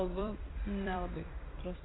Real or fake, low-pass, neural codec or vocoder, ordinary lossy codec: fake; 7.2 kHz; vocoder, 44.1 kHz, 128 mel bands every 256 samples, BigVGAN v2; AAC, 16 kbps